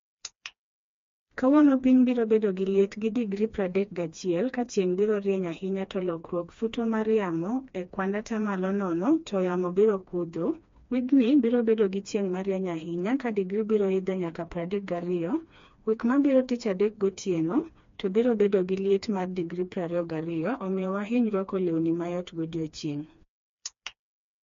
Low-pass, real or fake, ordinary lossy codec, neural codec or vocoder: 7.2 kHz; fake; MP3, 48 kbps; codec, 16 kHz, 2 kbps, FreqCodec, smaller model